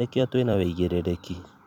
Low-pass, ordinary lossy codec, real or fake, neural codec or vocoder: 19.8 kHz; none; fake; vocoder, 44.1 kHz, 128 mel bands every 256 samples, BigVGAN v2